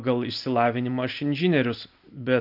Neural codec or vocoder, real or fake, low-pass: none; real; 5.4 kHz